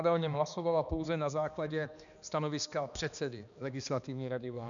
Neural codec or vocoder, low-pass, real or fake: codec, 16 kHz, 2 kbps, X-Codec, HuBERT features, trained on balanced general audio; 7.2 kHz; fake